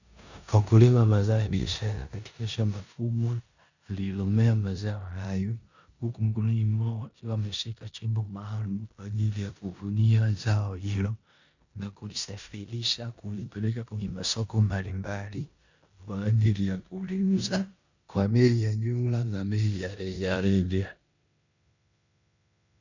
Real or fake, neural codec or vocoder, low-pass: fake; codec, 16 kHz in and 24 kHz out, 0.9 kbps, LongCat-Audio-Codec, four codebook decoder; 7.2 kHz